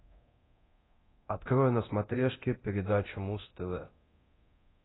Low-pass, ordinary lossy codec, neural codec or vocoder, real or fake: 7.2 kHz; AAC, 16 kbps; codec, 24 kHz, 0.9 kbps, DualCodec; fake